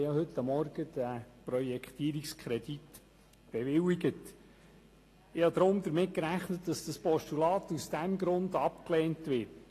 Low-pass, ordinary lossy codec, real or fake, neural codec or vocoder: 14.4 kHz; AAC, 48 kbps; real; none